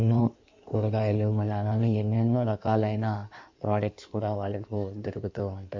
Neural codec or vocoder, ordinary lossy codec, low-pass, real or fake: codec, 16 kHz in and 24 kHz out, 1.1 kbps, FireRedTTS-2 codec; MP3, 64 kbps; 7.2 kHz; fake